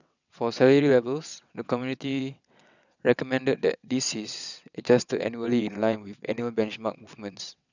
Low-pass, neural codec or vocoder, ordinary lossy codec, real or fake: 7.2 kHz; vocoder, 22.05 kHz, 80 mel bands, WaveNeXt; none; fake